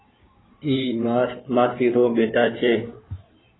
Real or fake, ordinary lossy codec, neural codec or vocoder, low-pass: fake; AAC, 16 kbps; codec, 16 kHz in and 24 kHz out, 2.2 kbps, FireRedTTS-2 codec; 7.2 kHz